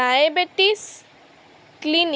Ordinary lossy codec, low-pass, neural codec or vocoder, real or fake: none; none; none; real